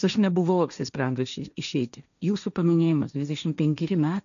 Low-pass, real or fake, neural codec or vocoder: 7.2 kHz; fake; codec, 16 kHz, 1.1 kbps, Voila-Tokenizer